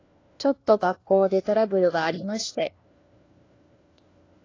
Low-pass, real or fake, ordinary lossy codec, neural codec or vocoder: 7.2 kHz; fake; AAC, 32 kbps; codec, 16 kHz, 1 kbps, FunCodec, trained on LibriTTS, 50 frames a second